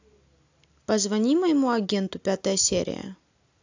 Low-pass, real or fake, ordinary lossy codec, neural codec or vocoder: 7.2 kHz; real; AAC, 48 kbps; none